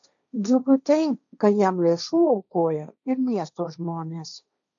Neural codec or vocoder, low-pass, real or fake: codec, 16 kHz, 1.1 kbps, Voila-Tokenizer; 7.2 kHz; fake